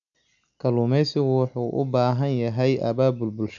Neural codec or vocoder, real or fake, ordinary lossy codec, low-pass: none; real; none; 7.2 kHz